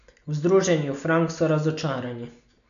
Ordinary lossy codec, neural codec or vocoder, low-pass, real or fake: none; none; 7.2 kHz; real